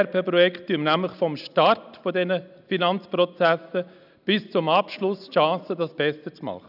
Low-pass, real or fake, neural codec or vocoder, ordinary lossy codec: 5.4 kHz; real; none; none